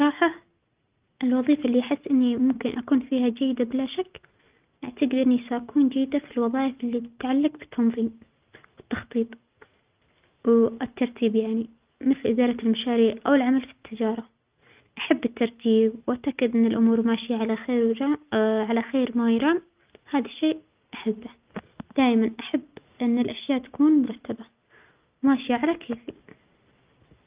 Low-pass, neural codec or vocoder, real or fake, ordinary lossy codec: 3.6 kHz; none; real; Opus, 24 kbps